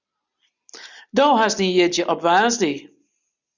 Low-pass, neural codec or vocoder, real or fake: 7.2 kHz; none; real